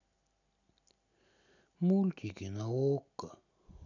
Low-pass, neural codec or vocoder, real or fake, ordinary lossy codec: 7.2 kHz; none; real; none